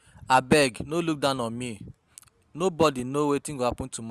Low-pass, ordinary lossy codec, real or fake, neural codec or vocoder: 14.4 kHz; Opus, 64 kbps; real; none